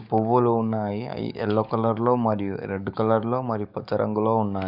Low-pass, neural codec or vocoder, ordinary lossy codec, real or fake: 5.4 kHz; codec, 44.1 kHz, 7.8 kbps, DAC; none; fake